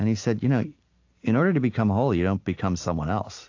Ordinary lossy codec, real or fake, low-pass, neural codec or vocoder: AAC, 48 kbps; real; 7.2 kHz; none